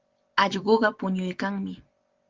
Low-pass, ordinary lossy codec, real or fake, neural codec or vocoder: 7.2 kHz; Opus, 16 kbps; real; none